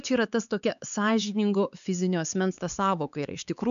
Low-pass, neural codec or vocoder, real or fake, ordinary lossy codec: 7.2 kHz; codec, 16 kHz, 4 kbps, X-Codec, HuBERT features, trained on LibriSpeech; fake; MP3, 96 kbps